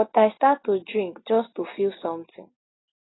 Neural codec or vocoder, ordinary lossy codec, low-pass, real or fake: none; AAC, 16 kbps; 7.2 kHz; real